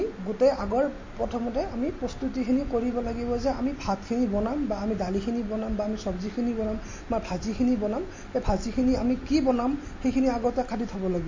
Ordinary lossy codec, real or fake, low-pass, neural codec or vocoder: MP3, 32 kbps; real; 7.2 kHz; none